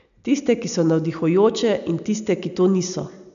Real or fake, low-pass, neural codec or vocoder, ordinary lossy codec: real; 7.2 kHz; none; none